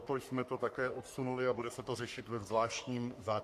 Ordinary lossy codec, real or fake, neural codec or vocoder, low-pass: AAC, 96 kbps; fake; codec, 44.1 kHz, 3.4 kbps, Pupu-Codec; 14.4 kHz